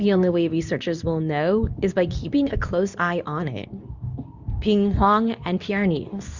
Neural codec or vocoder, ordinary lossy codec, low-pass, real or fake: codec, 24 kHz, 0.9 kbps, WavTokenizer, medium speech release version 2; Opus, 64 kbps; 7.2 kHz; fake